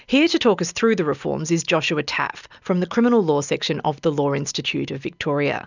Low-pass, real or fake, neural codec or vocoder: 7.2 kHz; real; none